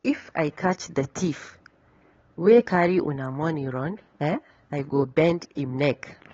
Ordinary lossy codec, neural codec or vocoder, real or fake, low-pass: AAC, 24 kbps; codec, 16 kHz, 8 kbps, FunCodec, trained on LibriTTS, 25 frames a second; fake; 7.2 kHz